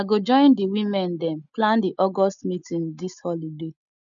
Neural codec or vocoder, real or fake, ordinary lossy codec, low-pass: none; real; none; 7.2 kHz